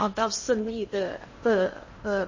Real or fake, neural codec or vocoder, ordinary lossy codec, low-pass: fake; codec, 16 kHz in and 24 kHz out, 0.6 kbps, FocalCodec, streaming, 2048 codes; MP3, 32 kbps; 7.2 kHz